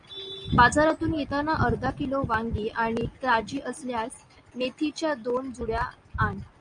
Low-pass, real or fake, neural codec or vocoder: 9.9 kHz; real; none